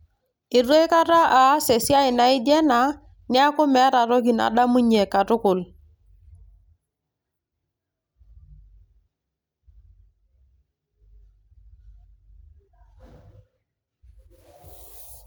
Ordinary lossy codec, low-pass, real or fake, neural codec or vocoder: none; none; real; none